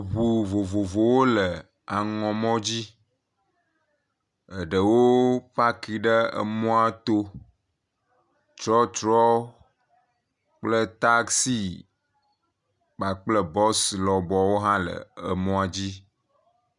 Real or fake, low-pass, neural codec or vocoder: real; 10.8 kHz; none